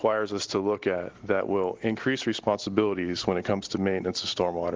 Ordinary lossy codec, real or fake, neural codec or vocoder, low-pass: Opus, 16 kbps; real; none; 7.2 kHz